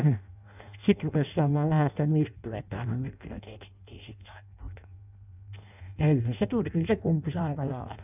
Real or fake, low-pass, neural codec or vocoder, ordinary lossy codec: fake; 3.6 kHz; codec, 16 kHz in and 24 kHz out, 0.6 kbps, FireRedTTS-2 codec; none